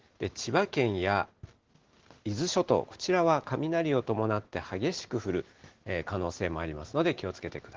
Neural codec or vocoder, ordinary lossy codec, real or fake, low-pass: none; Opus, 16 kbps; real; 7.2 kHz